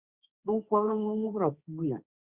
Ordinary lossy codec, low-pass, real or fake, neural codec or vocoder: Opus, 64 kbps; 3.6 kHz; fake; codec, 16 kHz, 1.1 kbps, Voila-Tokenizer